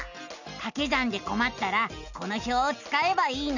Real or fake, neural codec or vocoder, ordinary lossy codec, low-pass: real; none; none; 7.2 kHz